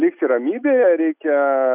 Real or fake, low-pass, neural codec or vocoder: real; 3.6 kHz; none